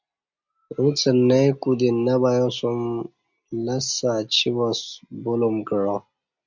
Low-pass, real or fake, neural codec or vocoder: 7.2 kHz; real; none